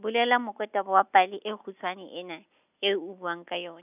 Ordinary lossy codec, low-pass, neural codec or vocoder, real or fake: none; 3.6 kHz; none; real